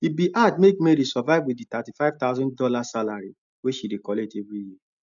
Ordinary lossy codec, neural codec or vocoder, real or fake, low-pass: none; none; real; 7.2 kHz